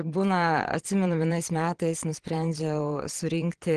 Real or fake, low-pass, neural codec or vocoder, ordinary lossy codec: fake; 9.9 kHz; vocoder, 22.05 kHz, 80 mel bands, Vocos; Opus, 16 kbps